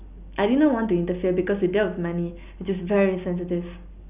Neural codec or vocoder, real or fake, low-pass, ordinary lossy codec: none; real; 3.6 kHz; none